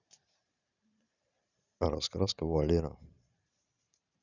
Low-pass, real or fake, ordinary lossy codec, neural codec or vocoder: 7.2 kHz; fake; none; vocoder, 44.1 kHz, 128 mel bands every 512 samples, BigVGAN v2